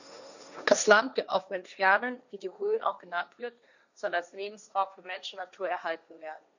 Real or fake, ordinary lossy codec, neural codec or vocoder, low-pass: fake; none; codec, 16 kHz, 1.1 kbps, Voila-Tokenizer; 7.2 kHz